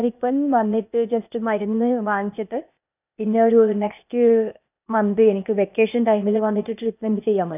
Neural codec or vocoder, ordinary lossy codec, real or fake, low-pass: codec, 16 kHz, 0.8 kbps, ZipCodec; none; fake; 3.6 kHz